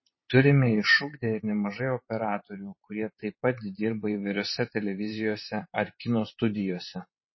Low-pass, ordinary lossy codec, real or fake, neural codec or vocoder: 7.2 kHz; MP3, 24 kbps; fake; vocoder, 44.1 kHz, 128 mel bands every 512 samples, BigVGAN v2